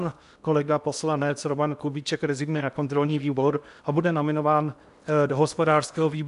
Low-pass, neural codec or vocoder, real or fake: 10.8 kHz; codec, 16 kHz in and 24 kHz out, 0.8 kbps, FocalCodec, streaming, 65536 codes; fake